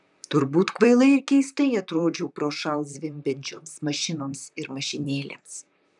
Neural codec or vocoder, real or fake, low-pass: vocoder, 44.1 kHz, 128 mel bands, Pupu-Vocoder; fake; 10.8 kHz